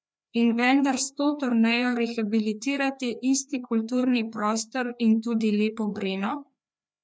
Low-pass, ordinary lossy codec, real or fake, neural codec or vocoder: none; none; fake; codec, 16 kHz, 2 kbps, FreqCodec, larger model